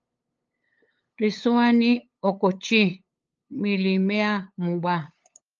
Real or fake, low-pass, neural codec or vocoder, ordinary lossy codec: fake; 7.2 kHz; codec, 16 kHz, 8 kbps, FunCodec, trained on LibriTTS, 25 frames a second; Opus, 24 kbps